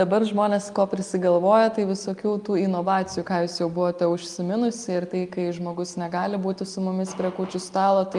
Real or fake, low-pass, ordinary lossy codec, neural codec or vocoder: real; 10.8 kHz; Opus, 32 kbps; none